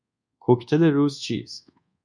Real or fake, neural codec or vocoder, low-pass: fake; codec, 24 kHz, 1.2 kbps, DualCodec; 9.9 kHz